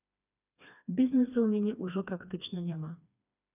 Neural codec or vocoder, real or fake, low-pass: codec, 16 kHz, 2 kbps, FreqCodec, smaller model; fake; 3.6 kHz